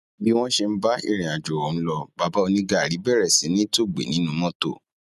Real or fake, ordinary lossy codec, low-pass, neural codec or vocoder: real; none; 14.4 kHz; none